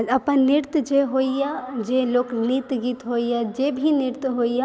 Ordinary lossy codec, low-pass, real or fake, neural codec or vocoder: none; none; real; none